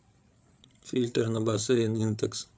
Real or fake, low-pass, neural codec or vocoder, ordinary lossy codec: fake; none; codec, 16 kHz, 8 kbps, FreqCodec, larger model; none